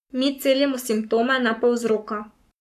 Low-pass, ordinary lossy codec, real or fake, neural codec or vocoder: 14.4 kHz; none; fake; codec, 44.1 kHz, 7.8 kbps, Pupu-Codec